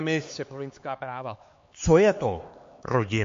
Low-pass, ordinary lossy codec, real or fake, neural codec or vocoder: 7.2 kHz; MP3, 48 kbps; fake; codec, 16 kHz, 4 kbps, X-Codec, HuBERT features, trained on LibriSpeech